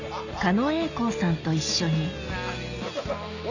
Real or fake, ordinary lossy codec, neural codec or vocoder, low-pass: real; none; none; 7.2 kHz